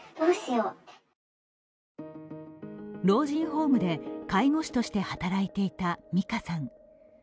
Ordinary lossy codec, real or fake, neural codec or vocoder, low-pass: none; real; none; none